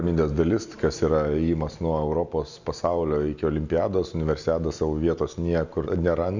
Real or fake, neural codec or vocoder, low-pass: real; none; 7.2 kHz